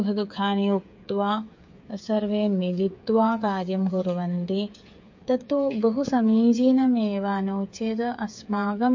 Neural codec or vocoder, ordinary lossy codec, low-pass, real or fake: codec, 16 kHz, 8 kbps, FreqCodec, smaller model; MP3, 48 kbps; 7.2 kHz; fake